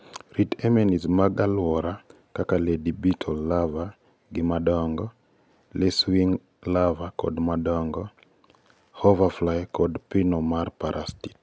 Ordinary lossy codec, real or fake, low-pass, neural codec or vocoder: none; real; none; none